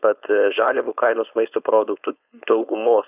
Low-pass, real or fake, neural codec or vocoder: 3.6 kHz; fake; codec, 16 kHz, 4.8 kbps, FACodec